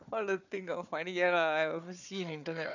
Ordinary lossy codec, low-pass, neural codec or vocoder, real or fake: none; 7.2 kHz; codec, 16 kHz, 4 kbps, FunCodec, trained on Chinese and English, 50 frames a second; fake